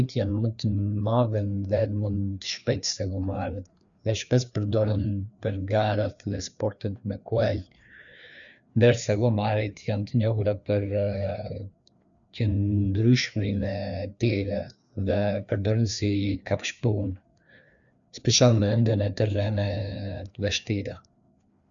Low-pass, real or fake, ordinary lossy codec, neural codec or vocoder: 7.2 kHz; fake; none; codec, 16 kHz, 2 kbps, FreqCodec, larger model